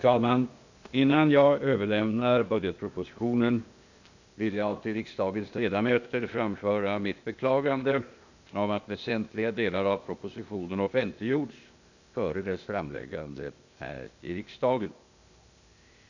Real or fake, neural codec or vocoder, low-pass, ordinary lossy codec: fake; codec, 16 kHz, 0.8 kbps, ZipCodec; 7.2 kHz; none